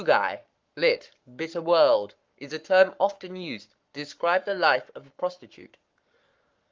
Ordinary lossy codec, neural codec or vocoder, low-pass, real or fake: Opus, 24 kbps; codec, 44.1 kHz, 7.8 kbps, Pupu-Codec; 7.2 kHz; fake